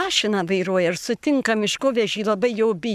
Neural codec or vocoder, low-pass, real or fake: codec, 44.1 kHz, 7.8 kbps, Pupu-Codec; 14.4 kHz; fake